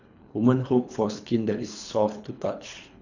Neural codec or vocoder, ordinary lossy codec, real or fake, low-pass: codec, 24 kHz, 3 kbps, HILCodec; none; fake; 7.2 kHz